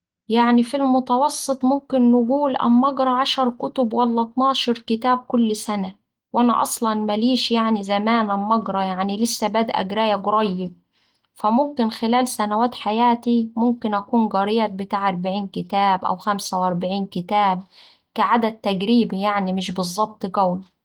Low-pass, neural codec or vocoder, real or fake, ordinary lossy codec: 19.8 kHz; none; real; Opus, 24 kbps